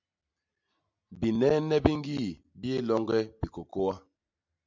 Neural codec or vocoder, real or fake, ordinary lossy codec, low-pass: none; real; MP3, 64 kbps; 7.2 kHz